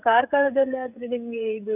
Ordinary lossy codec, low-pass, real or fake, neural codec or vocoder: none; 3.6 kHz; fake; codec, 16 kHz, 16 kbps, FreqCodec, smaller model